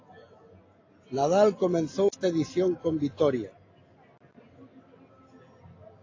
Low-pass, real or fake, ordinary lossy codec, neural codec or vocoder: 7.2 kHz; real; AAC, 32 kbps; none